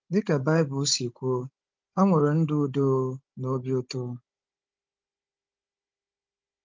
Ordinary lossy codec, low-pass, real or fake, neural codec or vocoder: Opus, 24 kbps; 7.2 kHz; fake; codec, 16 kHz, 16 kbps, FunCodec, trained on Chinese and English, 50 frames a second